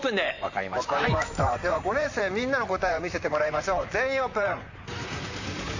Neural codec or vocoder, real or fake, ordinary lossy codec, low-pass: vocoder, 44.1 kHz, 128 mel bands, Pupu-Vocoder; fake; AAC, 48 kbps; 7.2 kHz